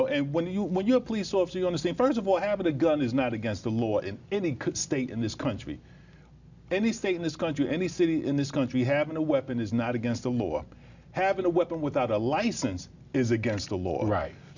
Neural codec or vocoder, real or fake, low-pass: none; real; 7.2 kHz